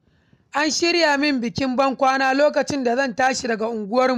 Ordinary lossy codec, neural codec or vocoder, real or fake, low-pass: none; none; real; 14.4 kHz